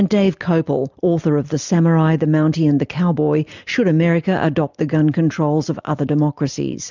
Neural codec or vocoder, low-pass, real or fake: none; 7.2 kHz; real